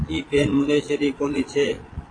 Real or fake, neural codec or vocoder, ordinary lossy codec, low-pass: fake; vocoder, 22.05 kHz, 80 mel bands, Vocos; AAC, 32 kbps; 9.9 kHz